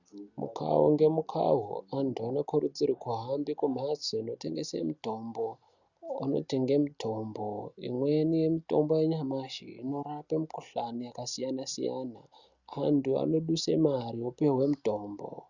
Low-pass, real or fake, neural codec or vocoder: 7.2 kHz; real; none